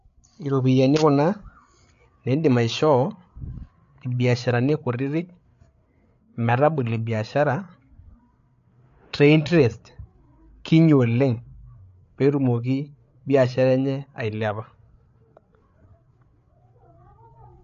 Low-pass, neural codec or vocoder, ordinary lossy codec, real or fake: 7.2 kHz; codec, 16 kHz, 8 kbps, FreqCodec, larger model; AAC, 64 kbps; fake